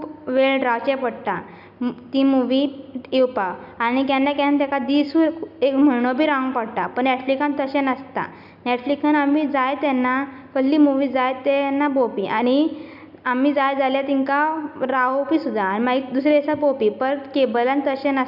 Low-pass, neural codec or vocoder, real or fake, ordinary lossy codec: 5.4 kHz; none; real; none